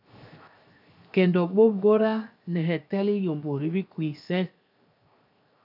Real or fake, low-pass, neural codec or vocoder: fake; 5.4 kHz; codec, 16 kHz, 0.7 kbps, FocalCodec